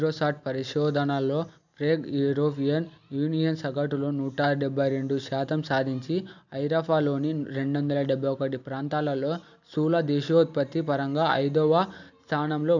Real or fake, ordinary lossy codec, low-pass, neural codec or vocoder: real; none; 7.2 kHz; none